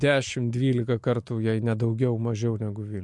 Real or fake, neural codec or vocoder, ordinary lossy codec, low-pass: real; none; MP3, 64 kbps; 10.8 kHz